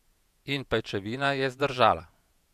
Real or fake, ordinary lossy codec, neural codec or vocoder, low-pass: fake; none; vocoder, 48 kHz, 128 mel bands, Vocos; 14.4 kHz